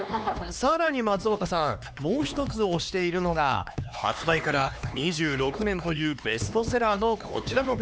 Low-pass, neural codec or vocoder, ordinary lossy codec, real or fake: none; codec, 16 kHz, 2 kbps, X-Codec, HuBERT features, trained on LibriSpeech; none; fake